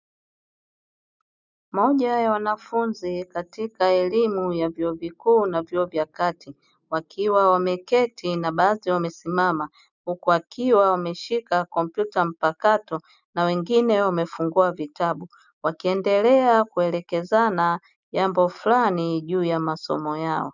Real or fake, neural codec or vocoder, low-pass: real; none; 7.2 kHz